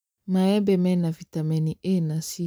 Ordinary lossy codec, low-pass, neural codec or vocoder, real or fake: none; none; vocoder, 44.1 kHz, 128 mel bands every 512 samples, BigVGAN v2; fake